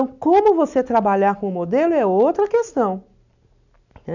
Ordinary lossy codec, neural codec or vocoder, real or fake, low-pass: none; none; real; 7.2 kHz